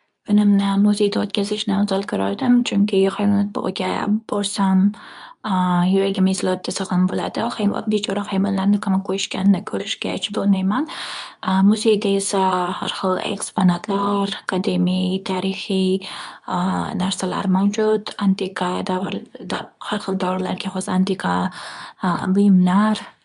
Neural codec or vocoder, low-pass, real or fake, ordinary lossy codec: codec, 24 kHz, 0.9 kbps, WavTokenizer, medium speech release version 2; 10.8 kHz; fake; none